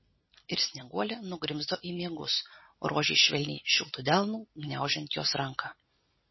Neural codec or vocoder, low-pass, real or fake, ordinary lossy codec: none; 7.2 kHz; real; MP3, 24 kbps